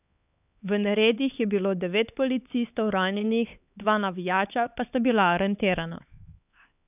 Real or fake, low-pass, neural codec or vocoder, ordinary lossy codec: fake; 3.6 kHz; codec, 16 kHz, 4 kbps, X-Codec, HuBERT features, trained on LibriSpeech; none